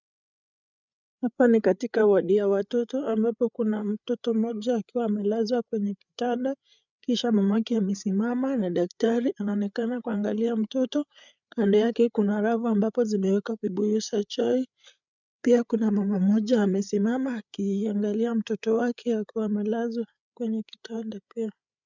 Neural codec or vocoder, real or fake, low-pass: codec, 16 kHz, 8 kbps, FreqCodec, larger model; fake; 7.2 kHz